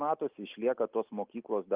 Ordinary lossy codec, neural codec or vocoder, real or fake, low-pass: Opus, 24 kbps; none; real; 3.6 kHz